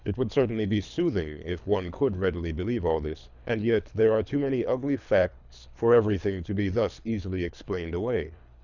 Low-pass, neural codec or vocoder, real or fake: 7.2 kHz; codec, 24 kHz, 3 kbps, HILCodec; fake